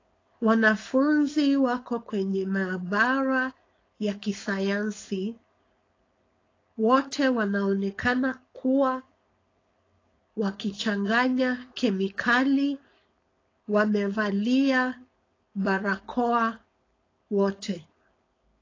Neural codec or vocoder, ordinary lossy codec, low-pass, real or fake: codec, 16 kHz, 4.8 kbps, FACodec; AAC, 32 kbps; 7.2 kHz; fake